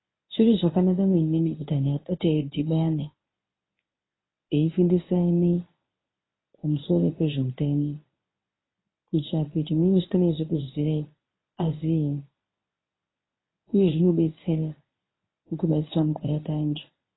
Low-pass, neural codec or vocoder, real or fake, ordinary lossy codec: 7.2 kHz; codec, 24 kHz, 0.9 kbps, WavTokenizer, medium speech release version 1; fake; AAC, 16 kbps